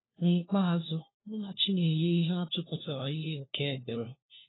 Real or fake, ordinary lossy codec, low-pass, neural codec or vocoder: fake; AAC, 16 kbps; 7.2 kHz; codec, 16 kHz, 1 kbps, FunCodec, trained on LibriTTS, 50 frames a second